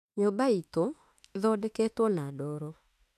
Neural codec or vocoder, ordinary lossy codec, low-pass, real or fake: autoencoder, 48 kHz, 32 numbers a frame, DAC-VAE, trained on Japanese speech; none; 14.4 kHz; fake